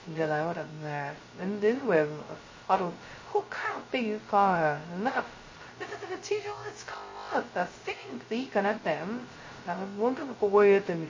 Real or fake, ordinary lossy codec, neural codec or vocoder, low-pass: fake; MP3, 32 kbps; codec, 16 kHz, 0.2 kbps, FocalCodec; 7.2 kHz